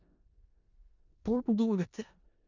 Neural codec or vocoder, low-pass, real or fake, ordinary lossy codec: codec, 16 kHz in and 24 kHz out, 0.4 kbps, LongCat-Audio-Codec, four codebook decoder; 7.2 kHz; fake; none